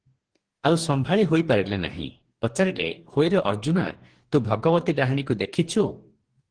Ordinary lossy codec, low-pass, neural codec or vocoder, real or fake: Opus, 16 kbps; 9.9 kHz; codec, 44.1 kHz, 2.6 kbps, DAC; fake